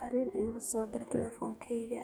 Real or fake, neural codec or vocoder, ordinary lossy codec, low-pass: fake; codec, 44.1 kHz, 2.6 kbps, DAC; none; none